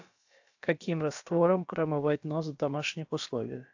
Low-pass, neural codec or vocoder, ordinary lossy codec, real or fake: 7.2 kHz; codec, 16 kHz, about 1 kbps, DyCAST, with the encoder's durations; AAC, 48 kbps; fake